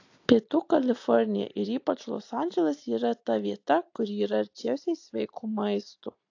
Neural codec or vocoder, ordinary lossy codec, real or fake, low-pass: none; AAC, 48 kbps; real; 7.2 kHz